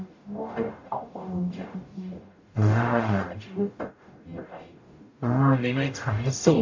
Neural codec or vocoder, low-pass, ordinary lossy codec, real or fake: codec, 44.1 kHz, 0.9 kbps, DAC; 7.2 kHz; AAC, 48 kbps; fake